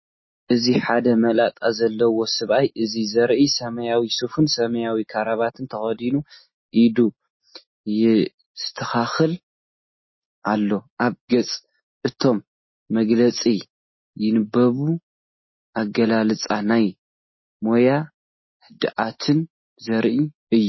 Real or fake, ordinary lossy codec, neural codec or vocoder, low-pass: real; MP3, 24 kbps; none; 7.2 kHz